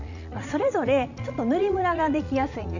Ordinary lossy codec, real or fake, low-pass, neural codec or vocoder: none; fake; 7.2 kHz; vocoder, 44.1 kHz, 80 mel bands, Vocos